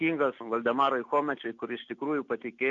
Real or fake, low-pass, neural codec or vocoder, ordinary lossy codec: real; 7.2 kHz; none; MP3, 48 kbps